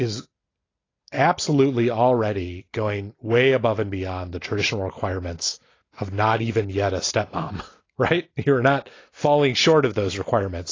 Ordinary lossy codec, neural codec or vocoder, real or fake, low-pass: AAC, 32 kbps; none; real; 7.2 kHz